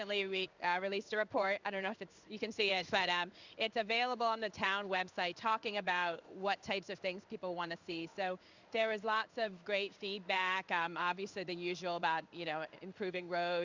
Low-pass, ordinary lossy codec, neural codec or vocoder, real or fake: 7.2 kHz; Opus, 64 kbps; codec, 16 kHz in and 24 kHz out, 1 kbps, XY-Tokenizer; fake